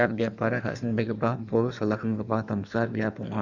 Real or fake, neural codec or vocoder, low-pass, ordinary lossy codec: fake; codec, 16 kHz in and 24 kHz out, 1.1 kbps, FireRedTTS-2 codec; 7.2 kHz; none